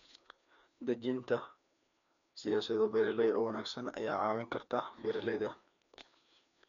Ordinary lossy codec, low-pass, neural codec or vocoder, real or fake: none; 7.2 kHz; codec, 16 kHz, 2 kbps, FreqCodec, larger model; fake